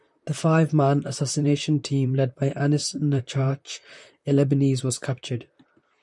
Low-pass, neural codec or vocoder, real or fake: 10.8 kHz; vocoder, 44.1 kHz, 128 mel bands, Pupu-Vocoder; fake